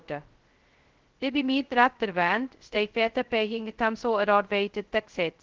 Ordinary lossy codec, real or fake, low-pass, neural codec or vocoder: Opus, 16 kbps; fake; 7.2 kHz; codec, 16 kHz, 0.2 kbps, FocalCodec